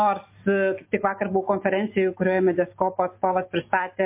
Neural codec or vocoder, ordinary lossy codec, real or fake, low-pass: none; MP3, 24 kbps; real; 3.6 kHz